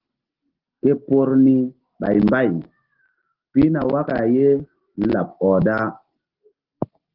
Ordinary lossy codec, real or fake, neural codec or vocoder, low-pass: Opus, 16 kbps; real; none; 5.4 kHz